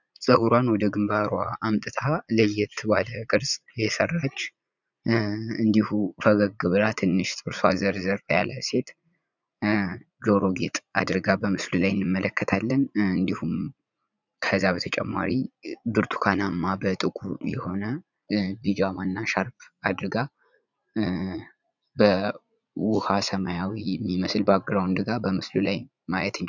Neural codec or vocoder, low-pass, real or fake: vocoder, 44.1 kHz, 80 mel bands, Vocos; 7.2 kHz; fake